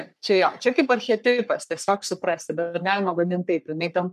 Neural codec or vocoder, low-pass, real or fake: codec, 44.1 kHz, 3.4 kbps, Pupu-Codec; 14.4 kHz; fake